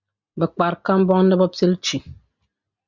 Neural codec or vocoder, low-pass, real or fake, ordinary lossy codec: none; 7.2 kHz; real; Opus, 64 kbps